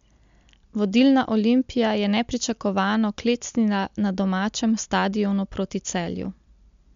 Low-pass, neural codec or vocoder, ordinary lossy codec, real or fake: 7.2 kHz; none; MP3, 64 kbps; real